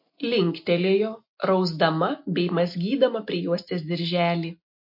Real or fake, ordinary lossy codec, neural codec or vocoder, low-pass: real; MP3, 32 kbps; none; 5.4 kHz